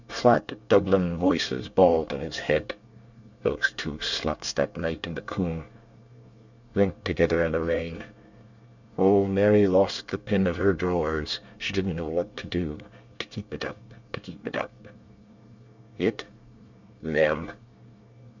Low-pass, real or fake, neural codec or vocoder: 7.2 kHz; fake; codec, 24 kHz, 1 kbps, SNAC